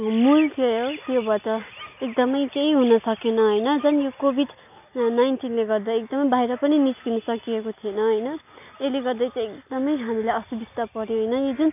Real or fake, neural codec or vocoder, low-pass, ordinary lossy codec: real; none; 3.6 kHz; none